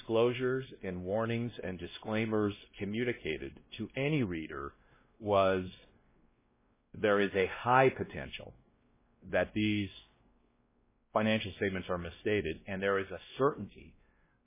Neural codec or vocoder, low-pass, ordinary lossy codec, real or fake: codec, 16 kHz, 1 kbps, X-Codec, WavLM features, trained on Multilingual LibriSpeech; 3.6 kHz; MP3, 16 kbps; fake